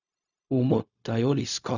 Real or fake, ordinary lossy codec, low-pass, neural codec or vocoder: fake; none; 7.2 kHz; codec, 16 kHz, 0.4 kbps, LongCat-Audio-Codec